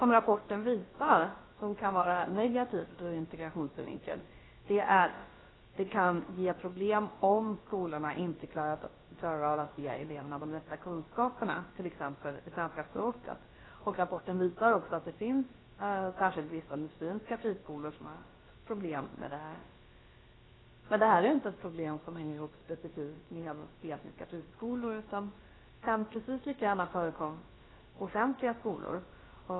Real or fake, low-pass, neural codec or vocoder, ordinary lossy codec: fake; 7.2 kHz; codec, 16 kHz, about 1 kbps, DyCAST, with the encoder's durations; AAC, 16 kbps